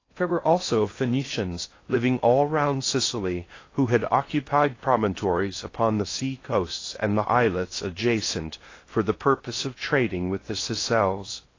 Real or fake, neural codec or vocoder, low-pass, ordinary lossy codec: fake; codec, 16 kHz in and 24 kHz out, 0.6 kbps, FocalCodec, streaming, 2048 codes; 7.2 kHz; AAC, 32 kbps